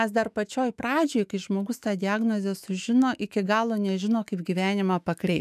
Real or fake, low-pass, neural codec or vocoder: fake; 14.4 kHz; autoencoder, 48 kHz, 128 numbers a frame, DAC-VAE, trained on Japanese speech